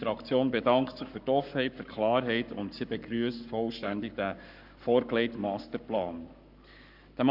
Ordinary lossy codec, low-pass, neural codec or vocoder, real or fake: none; 5.4 kHz; codec, 44.1 kHz, 7.8 kbps, Pupu-Codec; fake